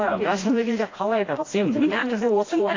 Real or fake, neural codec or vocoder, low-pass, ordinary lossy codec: fake; codec, 16 kHz, 1 kbps, FreqCodec, smaller model; 7.2 kHz; AAC, 32 kbps